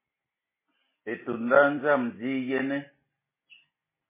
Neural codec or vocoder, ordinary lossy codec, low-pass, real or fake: none; MP3, 16 kbps; 3.6 kHz; real